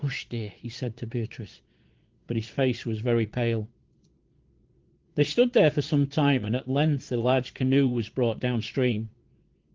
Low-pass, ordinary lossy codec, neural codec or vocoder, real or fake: 7.2 kHz; Opus, 16 kbps; vocoder, 22.05 kHz, 80 mel bands, Vocos; fake